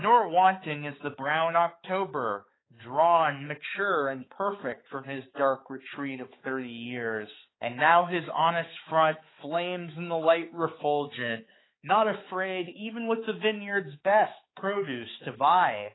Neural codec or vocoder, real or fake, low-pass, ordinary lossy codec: codec, 16 kHz, 4 kbps, X-Codec, HuBERT features, trained on balanced general audio; fake; 7.2 kHz; AAC, 16 kbps